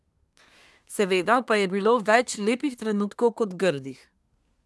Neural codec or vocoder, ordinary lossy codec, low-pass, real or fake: codec, 24 kHz, 1 kbps, SNAC; none; none; fake